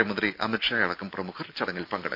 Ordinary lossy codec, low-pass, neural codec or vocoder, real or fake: none; 5.4 kHz; none; real